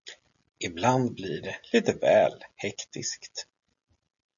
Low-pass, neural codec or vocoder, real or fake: 7.2 kHz; none; real